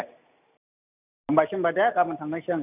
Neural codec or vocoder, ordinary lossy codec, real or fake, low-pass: none; none; real; 3.6 kHz